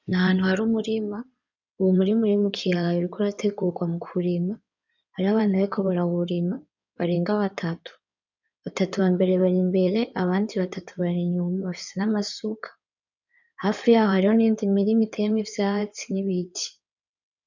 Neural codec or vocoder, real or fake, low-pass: codec, 16 kHz in and 24 kHz out, 2.2 kbps, FireRedTTS-2 codec; fake; 7.2 kHz